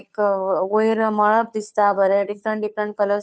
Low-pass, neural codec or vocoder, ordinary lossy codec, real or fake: none; codec, 16 kHz, 2 kbps, FunCodec, trained on Chinese and English, 25 frames a second; none; fake